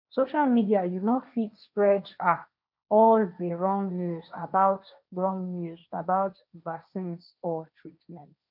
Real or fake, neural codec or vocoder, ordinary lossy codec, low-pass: fake; codec, 16 kHz, 1.1 kbps, Voila-Tokenizer; none; 5.4 kHz